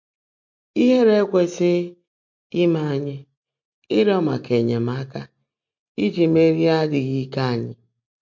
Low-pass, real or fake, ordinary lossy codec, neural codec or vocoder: 7.2 kHz; real; MP3, 48 kbps; none